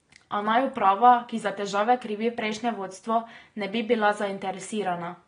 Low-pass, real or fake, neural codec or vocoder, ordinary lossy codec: 9.9 kHz; real; none; AAC, 32 kbps